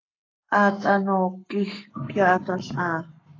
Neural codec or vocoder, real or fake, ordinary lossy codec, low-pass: codec, 44.1 kHz, 7.8 kbps, Pupu-Codec; fake; AAC, 32 kbps; 7.2 kHz